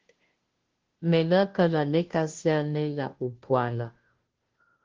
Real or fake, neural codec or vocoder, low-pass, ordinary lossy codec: fake; codec, 16 kHz, 0.5 kbps, FunCodec, trained on Chinese and English, 25 frames a second; 7.2 kHz; Opus, 16 kbps